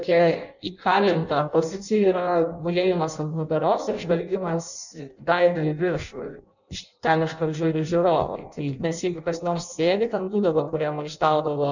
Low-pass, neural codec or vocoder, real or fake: 7.2 kHz; codec, 16 kHz in and 24 kHz out, 0.6 kbps, FireRedTTS-2 codec; fake